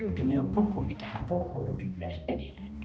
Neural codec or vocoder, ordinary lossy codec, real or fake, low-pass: codec, 16 kHz, 1 kbps, X-Codec, HuBERT features, trained on balanced general audio; none; fake; none